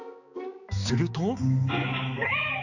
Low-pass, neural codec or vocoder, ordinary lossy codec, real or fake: 7.2 kHz; codec, 16 kHz, 4 kbps, X-Codec, HuBERT features, trained on balanced general audio; none; fake